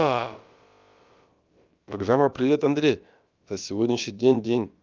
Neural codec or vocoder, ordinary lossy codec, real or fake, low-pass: codec, 16 kHz, about 1 kbps, DyCAST, with the encoder's durations; Opus, 24 kbps; fake; 7.2 kHz